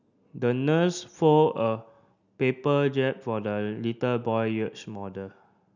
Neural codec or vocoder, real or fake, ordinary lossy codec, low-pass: none; real; none; 7.2 kHz